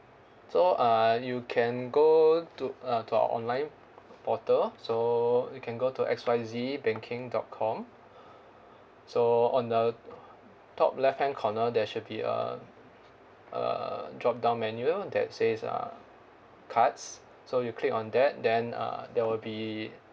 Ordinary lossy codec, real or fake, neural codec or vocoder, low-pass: none; real; none; none